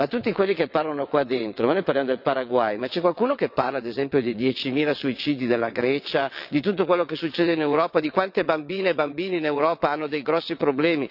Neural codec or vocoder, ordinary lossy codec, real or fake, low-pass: vocoder, 22.05 kHz, 80 mel bands, WaveNeXt; none; fake; 5.4 kHz